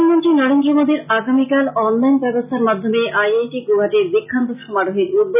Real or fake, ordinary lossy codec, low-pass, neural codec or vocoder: real; none; 3.6 kHz; none